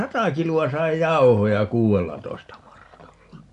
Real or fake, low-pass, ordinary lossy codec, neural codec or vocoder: real; 10.8 kHz; none; none